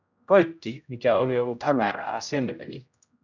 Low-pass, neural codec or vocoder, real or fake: 7.2 kHz; codec, 16 kHz, 0.5 kbps, X-Codec, HuBERT features, trained on general audio; fake